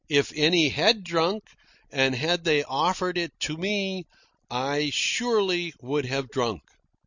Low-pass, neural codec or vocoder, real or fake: 7.2 kHz; none; real